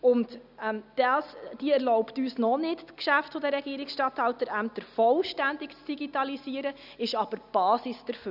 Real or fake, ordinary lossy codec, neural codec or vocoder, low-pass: real; none; none; 5.4 kHz